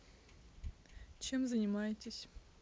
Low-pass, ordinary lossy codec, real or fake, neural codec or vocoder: none; none; real; none